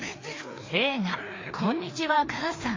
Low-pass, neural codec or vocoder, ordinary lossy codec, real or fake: 7.2 kHz; codec, 16 kHz, 2 kbps, FreqCodec, larger model; AAC, 32 kbps; fake